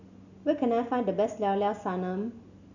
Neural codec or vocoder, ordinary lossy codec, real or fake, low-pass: none; none; real; 7.2 kHz